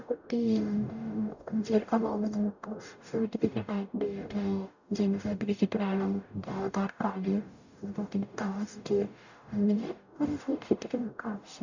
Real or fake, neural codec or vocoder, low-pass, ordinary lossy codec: fake; codec, 44.1 kHz, 0.9 kbps, DAC; 7.2 kHz; none